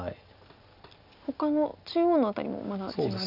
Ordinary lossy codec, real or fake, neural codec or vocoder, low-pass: none; real; none; 5.4 kHz